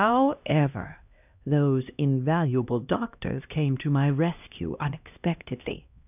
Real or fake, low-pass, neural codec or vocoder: fake; 3.6 kHz; codec, 16 kHz, 1 kbps, X-Codec, HuBERT features, trained on LibriSpeech